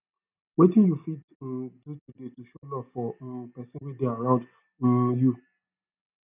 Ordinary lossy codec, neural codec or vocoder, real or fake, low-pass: none; none; real; 3.6 kHz